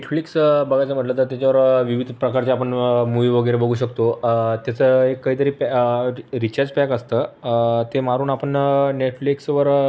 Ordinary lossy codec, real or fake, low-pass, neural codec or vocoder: none; real; none; none